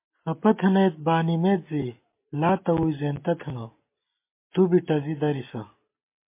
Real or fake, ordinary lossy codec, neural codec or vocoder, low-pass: real; MP3, 24 kbps; none; 3.6 kHz